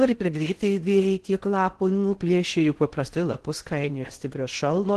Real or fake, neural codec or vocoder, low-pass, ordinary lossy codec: fake; codec, 16 kHz in and 24 kHz out, 0.6 kbps, FocalCodec, streaming, 2048 codes; 10.8 kHz; Opus, 16 kbps